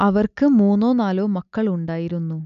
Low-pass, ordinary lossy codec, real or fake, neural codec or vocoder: 7.2 kHz; none; real; none